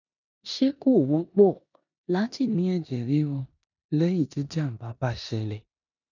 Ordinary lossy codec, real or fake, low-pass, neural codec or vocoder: none; fake; 7.2 kHz; codec, 16 kHz in and 24 kHz out, 0.9 kbps, LongCat-Audio-Codec, four codebook decoder